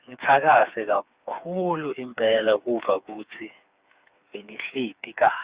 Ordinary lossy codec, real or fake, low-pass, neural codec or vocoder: Opus, 64 kbps; fake; 3.6 kHz; codec, 16 kHz, 4 kbps, FreqCodec, smaller model